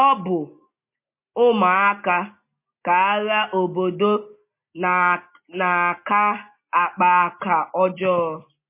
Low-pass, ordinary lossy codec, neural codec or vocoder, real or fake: 3.6 kHz; MP3, 24 kbps; none; real